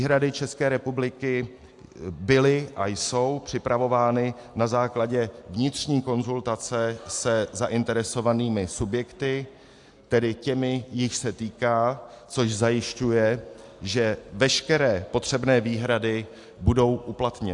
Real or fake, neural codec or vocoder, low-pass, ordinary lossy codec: real; none; 10.8 kHz; AAC, 64 kbps